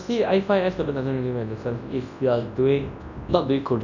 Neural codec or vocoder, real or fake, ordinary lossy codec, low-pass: codec, 24 kHz, 0.9 kbps, WavTokenizer, large speech release; fake; none; 7.2 kHz